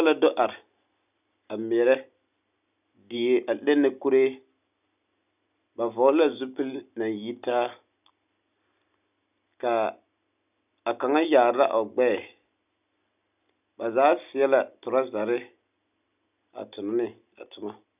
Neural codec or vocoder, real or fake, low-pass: none; real; 3.6 kHz